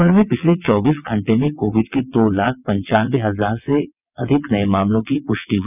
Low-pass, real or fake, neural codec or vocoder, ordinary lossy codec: 3.6 kHz; fake; vocoder, 22.05 kHz, 80 mel bands, WaveNeXt; none